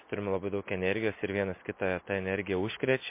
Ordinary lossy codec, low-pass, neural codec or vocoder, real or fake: MP3, 24 kbps; 3.6 kHz; none; real